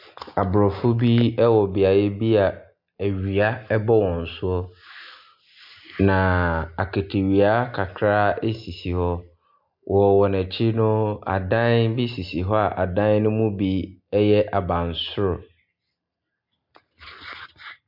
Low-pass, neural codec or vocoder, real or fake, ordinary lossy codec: 5.4 kHz; none; real; MP3, 48 kbps